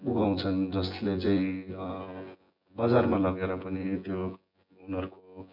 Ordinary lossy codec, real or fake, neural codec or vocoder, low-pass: none; fake; vocoder, 24 kHz, 100 mel bands, Vocos; 5.4 kHz